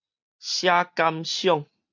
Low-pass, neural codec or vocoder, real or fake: 7.2 kHz; none; real